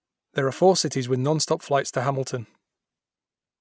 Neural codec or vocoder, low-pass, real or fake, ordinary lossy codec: none; none; real; none